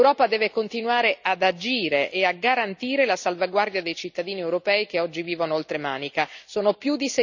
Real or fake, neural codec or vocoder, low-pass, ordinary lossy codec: real; none; 7.2 kHz; none